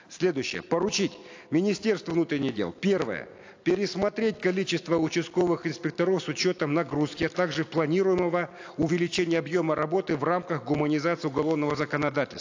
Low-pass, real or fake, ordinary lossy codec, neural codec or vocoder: 7.2 kHz; real; AAC, 48 kbps; none